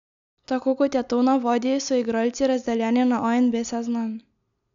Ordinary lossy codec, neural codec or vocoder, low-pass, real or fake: none; none; 7.2 kHz; real